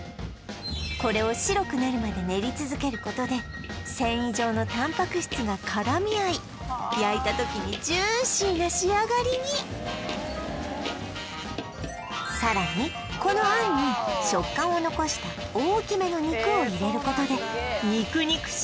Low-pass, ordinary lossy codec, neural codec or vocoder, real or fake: none; none; none; real